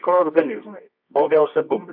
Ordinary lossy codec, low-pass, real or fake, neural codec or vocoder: MP3, 48 kbps; 5.4 kHz; fake; codec, 24 kHz, 0.9 kbps, WavTokenizer, medium music audio release